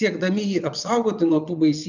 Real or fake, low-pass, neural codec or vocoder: fake; 7.2 kHz; vocoder, 22.05 kHz, 80 mel bands, WaveNeXt